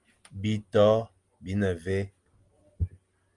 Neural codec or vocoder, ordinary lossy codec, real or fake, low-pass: none; Opus, 32 kbps; real; 10.8 kHz